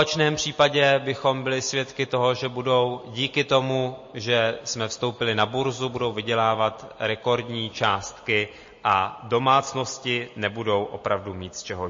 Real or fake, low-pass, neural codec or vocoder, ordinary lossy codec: real; 7.2 kHz; none; MP3, 32 kbps